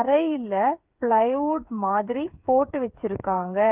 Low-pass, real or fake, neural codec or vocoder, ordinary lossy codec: 3.6 kHz; fake; codec, 16 kHz, 8 kbps, FreqCodec, smaller model; Opus, 24 kbps